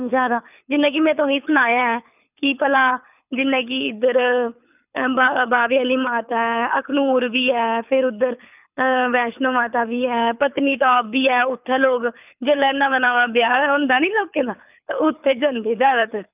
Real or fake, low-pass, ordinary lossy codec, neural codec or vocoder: fake; 3.6 kHz; none; codec, 24 kHz, 6 kbps, HILCodec